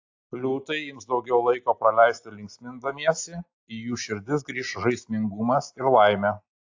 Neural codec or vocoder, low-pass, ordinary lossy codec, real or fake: none; 7.2 kHz; AAC, 48 kbps; real